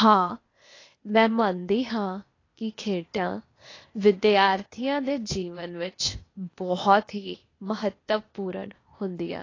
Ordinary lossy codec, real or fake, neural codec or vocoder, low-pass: AAC, 32 kbps; fake; codec, 16 kHz, 0.8 kbps, ZipCodec; 7.2 kHz